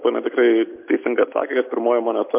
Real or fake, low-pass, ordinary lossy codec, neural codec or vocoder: real; 3.6 kHz; MP3, 32 kbps; none